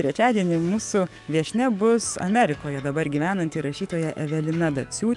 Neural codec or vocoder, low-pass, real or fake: codec, 44.1 kHz, 7.8 kbps, DAC; 10.8 kHz; fake